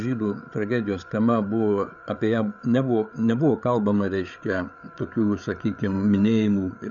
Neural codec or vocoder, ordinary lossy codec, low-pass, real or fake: codec, 16 kHz, 8 kbps, FreqCodec, larger model; MP3, 96 kbps; 7.2 kHz; fake